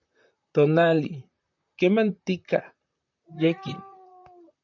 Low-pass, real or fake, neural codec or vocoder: 7.2 kHz; fake; vocoder, 44.1 kHz, 128 mel bands, Pupu-Vocoder